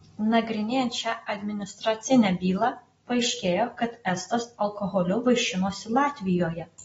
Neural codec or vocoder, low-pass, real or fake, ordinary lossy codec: none; 19.8 kHz; real; AAC, 24 kbps